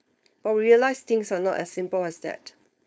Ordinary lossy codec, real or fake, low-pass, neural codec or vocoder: none; fake; none; codec, 16 kHz, 4.8 kbps, FACodec